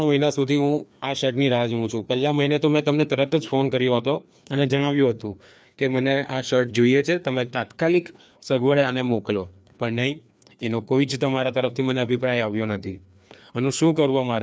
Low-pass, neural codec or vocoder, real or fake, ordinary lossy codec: none; codec, 16 kHz, 2 kbps, FreqCodec, larger model; fake; none